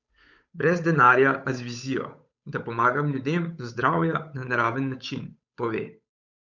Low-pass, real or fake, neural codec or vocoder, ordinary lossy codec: 7.2 kHz; fake; codec, 16 kHz, 8 kbps, FunCodec, trained on Chinese and English, 25 frames a second; none